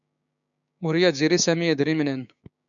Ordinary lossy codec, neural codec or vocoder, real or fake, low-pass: MP3, 96 kbps; codec, 16 kHz, 6 kbps, DAC; fake; 7.2 kHz